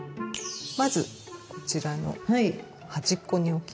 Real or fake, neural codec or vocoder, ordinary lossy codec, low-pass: real; none; none; none